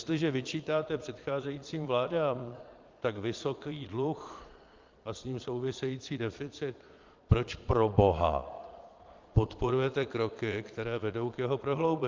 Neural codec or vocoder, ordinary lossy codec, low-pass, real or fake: vocoder, 22.05 kHz, 80 mel bands, Vocos; Opus, 24 kbps; 7.2 kHz; fake